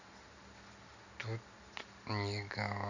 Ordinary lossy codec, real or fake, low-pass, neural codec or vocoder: none; real; 7.2 kHz; none